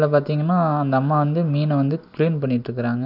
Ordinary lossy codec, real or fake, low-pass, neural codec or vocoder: none; real; 5.4 kHz; none